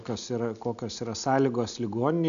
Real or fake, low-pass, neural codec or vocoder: real; 7.2 kHz; none